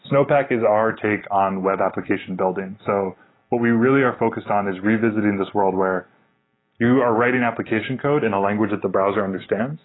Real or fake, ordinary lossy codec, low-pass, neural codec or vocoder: real; AAC, 16 kbps; 7.2 kHz; none